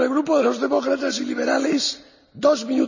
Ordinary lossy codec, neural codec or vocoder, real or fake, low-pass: none; none; real; 7.2 kHz